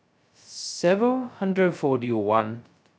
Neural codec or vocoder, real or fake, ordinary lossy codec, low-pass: codec, 16 kHz, 0.2 kbps, FocalCodec; fake; none; none